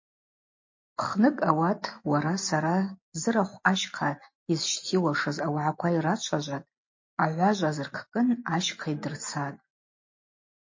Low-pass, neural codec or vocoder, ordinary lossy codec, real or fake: 7.2 kHz; none; MP3, 32 kbps; real